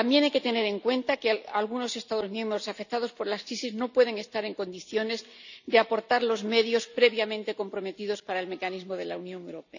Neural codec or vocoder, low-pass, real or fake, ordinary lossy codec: none; 7.2 kHz; real; none